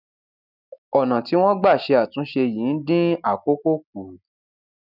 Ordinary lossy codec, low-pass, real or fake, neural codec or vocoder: none; 5.4 kHz; real; none